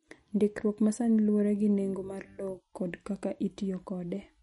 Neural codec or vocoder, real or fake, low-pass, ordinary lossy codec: none; real; 14.4 kHz; MP3, 48 kbps